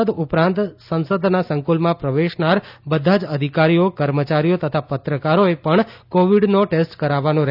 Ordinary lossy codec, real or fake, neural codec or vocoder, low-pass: none; real; none; 5.4 kHz